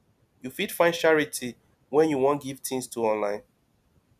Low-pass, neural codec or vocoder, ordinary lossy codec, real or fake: 14.4 kHz; none; none; real